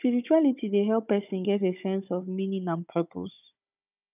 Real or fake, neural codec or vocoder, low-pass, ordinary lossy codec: fake; codec, 16 kHz, 16 kbps, FunCodec, trained on Chinese and English, 50 frames a second; 3.6 kHz; none